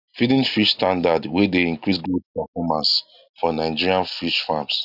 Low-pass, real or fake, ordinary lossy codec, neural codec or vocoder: 5.4 kHz; real; none; none